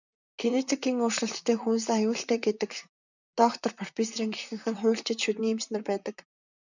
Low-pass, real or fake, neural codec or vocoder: 7.2 kHz; fake; vocoder, 44.1 kHz, 128 mel bands, Pupu-Vocoder